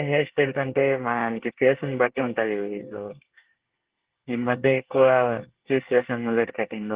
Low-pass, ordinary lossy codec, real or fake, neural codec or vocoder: 3.6 kHz; Opus, 16 kbps; fake; codec, 24 kHz, 1 kbps, SNAC